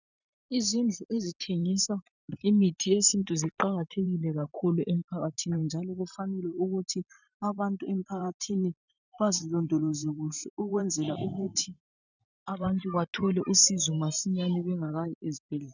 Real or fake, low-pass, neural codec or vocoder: fake; 7.2 kHz; vocoder, 44.1 kHz, 128 mel bands every 512 samples, BigVGAN v2